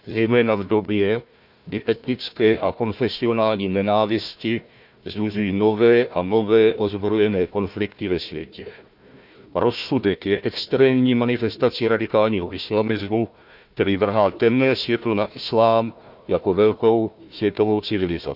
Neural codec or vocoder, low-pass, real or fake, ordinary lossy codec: codec, 16 kHz, 1 kbps, FunCodec, trained on Chinese and English, 50 frames a second; 5.4 kHz; fake; none